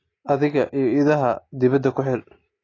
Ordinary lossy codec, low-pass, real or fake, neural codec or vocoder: AAC, 32 kbps; 7.2 kHz; real; none